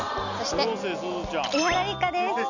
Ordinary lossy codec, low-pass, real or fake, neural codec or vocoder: none; 7.2 kHz; real; none